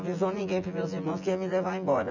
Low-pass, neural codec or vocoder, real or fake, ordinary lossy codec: 7.2 kHz; vocoder, 24 kHz, 100 mel bands, Vocos; fake; none